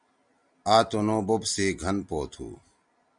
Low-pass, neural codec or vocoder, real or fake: 9.9 kHz; none; real